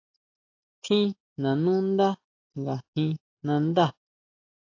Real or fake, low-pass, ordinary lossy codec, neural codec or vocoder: real; 7.2 kHz; AAC, 48 kbps; none